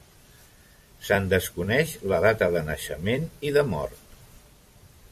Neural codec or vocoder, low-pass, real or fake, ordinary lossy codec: vocoder, 44.1 kHz, 128 mel bands every 256 samples, BigVGAN v2; 14.4 kHz; fake; MP3, 64 kbps